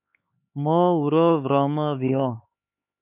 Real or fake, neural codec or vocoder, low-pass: fake; codec, 16 kHz, 4 kbps, X-Codec, HuBERT features, trained on LibriSpeech; 3.6 kHz